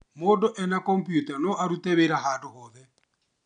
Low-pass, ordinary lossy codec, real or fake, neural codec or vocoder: 9.9 kHz; none; real; none